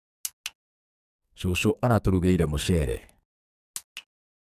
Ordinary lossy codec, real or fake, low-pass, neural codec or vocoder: none; fake; 14.4 kHz; codec, 44.1 kHz, 2.6 kbps, SNAC